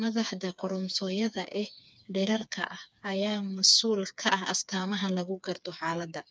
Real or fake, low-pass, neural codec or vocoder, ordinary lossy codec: fake; none; codec, 16 kHz, 4 kbps, FreqCodec, smaller model; none